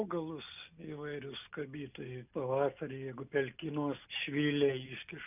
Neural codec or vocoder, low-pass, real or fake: none; 3.6 kHz; real